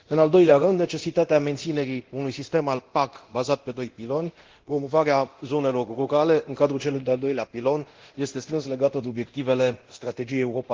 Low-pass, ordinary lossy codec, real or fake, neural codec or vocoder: 7.2 kHz; Opus, 16 kbps; fake; codec, 24 kHz, 0.9 kbps, DualCodec